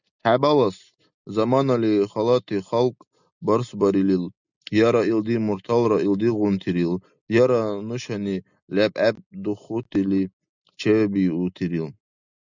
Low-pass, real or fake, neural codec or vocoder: 7.2 kHz; real; none